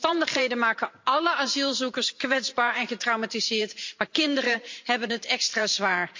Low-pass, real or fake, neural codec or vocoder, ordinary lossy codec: 7.2 kHz; fake; vocoder, 44.1 kHz, 128 mel bands every 512 samples, BigVGAN v2; MP3, 48 kbps